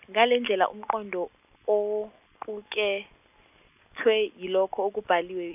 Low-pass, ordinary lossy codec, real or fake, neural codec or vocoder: 3.6 kHz; none; real; none